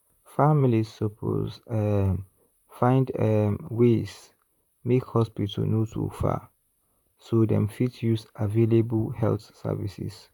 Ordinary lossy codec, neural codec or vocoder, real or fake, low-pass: none; vocoder, 44.1 kHz, 128 mel bands, Pupu-Vocoder; fake; 19.8 kHz